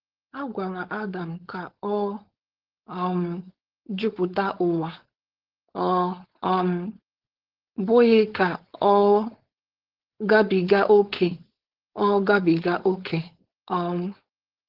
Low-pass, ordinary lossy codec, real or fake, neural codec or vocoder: 5.4 kHz; Opus, 16 kbps; fake; codec, 16 kHz, 4.8 kbps, FACodec